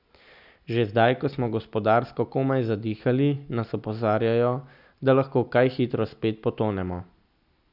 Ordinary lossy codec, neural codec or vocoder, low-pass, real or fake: none; none; 5.4 kHz; real